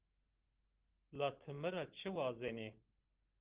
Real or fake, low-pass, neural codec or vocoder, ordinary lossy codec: real; 3.6 kHz; none; Opus, 16 kbps